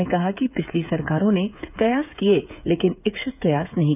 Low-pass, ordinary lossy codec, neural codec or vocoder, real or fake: 3.6 kHz; none; codec, 16 kHz, 8 kbps, FreqCodec, smaller model; fake